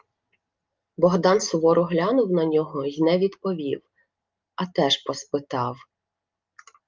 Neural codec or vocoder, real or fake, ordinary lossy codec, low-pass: none; real; Opus, 32 kbps; 7.2 kHz